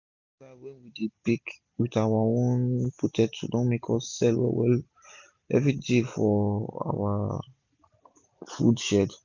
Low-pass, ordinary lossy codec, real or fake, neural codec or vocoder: 7.2 kHz; none; real; none